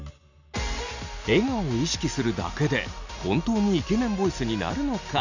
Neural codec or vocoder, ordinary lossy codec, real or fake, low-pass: none; none; real; 7.2 kHz